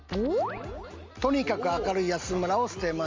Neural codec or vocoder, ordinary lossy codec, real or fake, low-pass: none; Opus, 32 kbps; real; 7.2 kHz